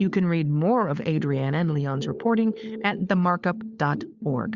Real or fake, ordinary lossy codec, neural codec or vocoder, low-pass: fake; Opus, 64 kbps; codec, 16 kHz, 4 kbps, FunCodec, trained on LibriTTS, 50 frames a second; 7.2 kHz